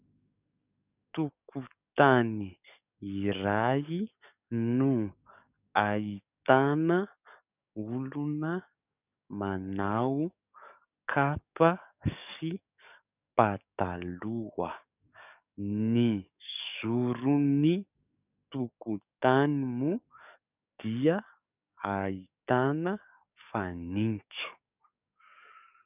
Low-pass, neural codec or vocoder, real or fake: 3.6 kHz; codec, 44.1 kHz, 7.8 kbps, DAC; fake